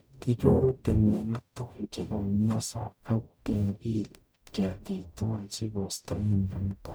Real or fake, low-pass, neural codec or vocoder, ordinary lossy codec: fake; none; codec, 44.1 kHz, 0.9 kbps, DAC; none